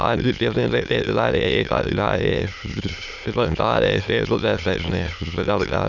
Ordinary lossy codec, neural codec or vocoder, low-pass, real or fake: none; autoencoder, 22.05 kHz, a latent of 192 numbers a frame, VITS, trained on many speakers; 7.2 kHz; fake